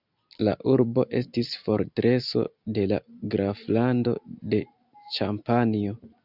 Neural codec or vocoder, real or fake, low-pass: none; real; 5.4 kHz